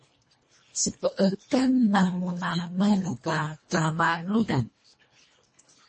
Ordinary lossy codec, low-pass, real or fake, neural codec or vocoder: MP3, 32 kbps; 10.8 kHz; fake; codec, 24 kHz, 1.5 kbps, HILCodec